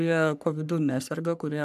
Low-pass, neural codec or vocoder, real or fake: 14.4 kHz; codec, 44.1 kHz, 3.4 kbps, Pupu-Codec; fake